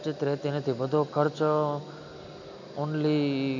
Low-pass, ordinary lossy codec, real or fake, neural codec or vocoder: 7.2 kHz; none; real; none